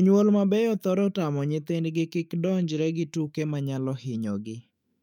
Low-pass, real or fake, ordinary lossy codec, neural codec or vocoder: 19.8 kHz; fake; none; autoencoder, 48 kHz, 128 numbers a frame, DAC-VAE, trained on Japanese speech